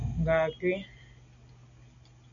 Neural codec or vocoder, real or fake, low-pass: none; real; 7.2 kHz